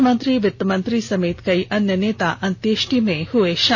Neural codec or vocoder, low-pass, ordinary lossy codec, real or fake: none; 7.2 kHz; none; real